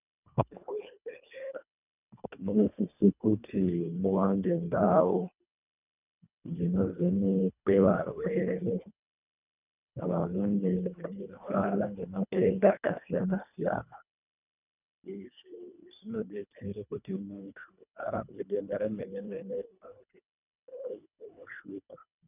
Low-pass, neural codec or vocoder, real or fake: 3.6 kHz; codec, 24 kHz, 1.5 kbps, HILCodec; fake